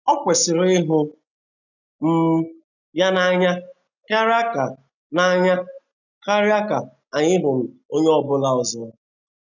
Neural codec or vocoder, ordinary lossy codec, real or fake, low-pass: none; none; real; 7.2 kHz